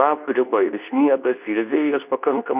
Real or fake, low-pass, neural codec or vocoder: fake; 3.6 kHz; codec, 24 kHz, 0.9 kbps, WavTokenizer, medium speech release version 2